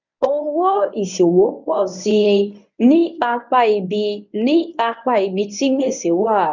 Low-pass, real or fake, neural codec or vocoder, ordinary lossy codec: 7.2 kHz; fake; codec, 24 kHz, 0.9 kbps, WavTokenizer, medium speech release version 1; none